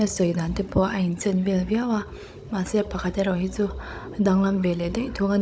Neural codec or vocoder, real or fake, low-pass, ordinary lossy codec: codec, 16 kHz, 16 kbps, FunCodec, trained on Chinese and English, 50 frames a second; fake; none; none